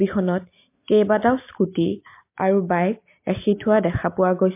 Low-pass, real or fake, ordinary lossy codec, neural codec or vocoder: 3.6 kHz; real; MP3, 24 kbps; none